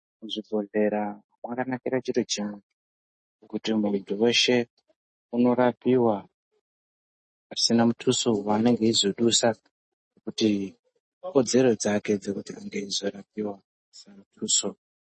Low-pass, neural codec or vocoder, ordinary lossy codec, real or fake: 10.8 kHz; none; MP3, 32 kbps; real